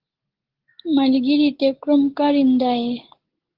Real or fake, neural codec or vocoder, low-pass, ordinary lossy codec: real; none; 5.4 kHz; Opus, 16 kbps